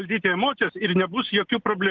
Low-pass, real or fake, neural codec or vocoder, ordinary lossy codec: 7.2 kHz; real; none; Opus, 32 kbps